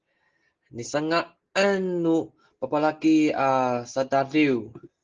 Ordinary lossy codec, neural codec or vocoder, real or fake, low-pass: Opus, 16 kbps; none; real; 7.2 kHz